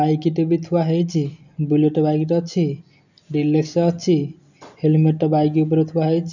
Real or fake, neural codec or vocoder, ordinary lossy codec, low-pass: real; none; none; 7.2 kHz